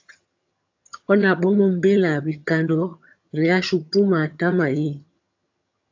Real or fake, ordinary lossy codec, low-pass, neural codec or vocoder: fake; AAC, 48 kbps; 7.2 kHz; vocoder, 22.05 kHz, 80 mel bands, HiFi-GAN